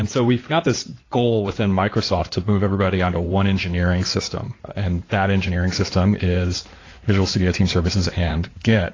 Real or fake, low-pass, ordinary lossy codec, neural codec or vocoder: fake; 7.2 kHz; AAC, 32 kbps; codec, 16 kHz in and 24 kHz out, 2.2 kbps, FireRedTTS-2 codec